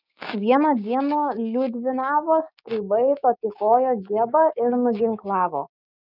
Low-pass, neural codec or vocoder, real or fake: 5.4 kHz; codec, 16 kHz, 6 kbps, DAC; fake